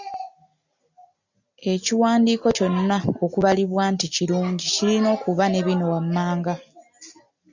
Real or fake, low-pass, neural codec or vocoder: real; 7.2 kHz; none